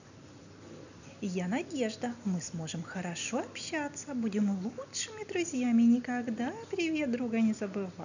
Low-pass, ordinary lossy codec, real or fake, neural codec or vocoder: 7.2 kHz; none; real; none